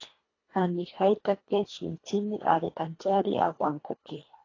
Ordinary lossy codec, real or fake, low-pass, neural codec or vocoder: AAC, 32 kbps; fake; 7.2 kHz; codec, 24 kHz, 1.5 kbps, HILCodec